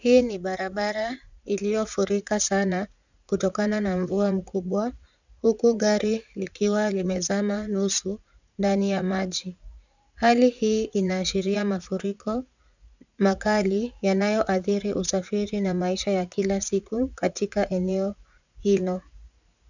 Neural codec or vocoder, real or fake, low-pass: vocoder, 44.1 kHz, 80 mel bands, Vocos; fake; 7.2 kHz